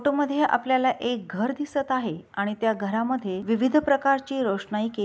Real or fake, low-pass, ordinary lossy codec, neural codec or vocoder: real; none; none; none